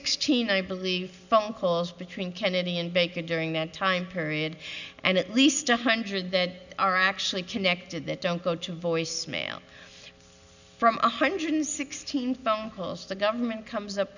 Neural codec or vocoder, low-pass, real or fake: none; 7.2 kHz; real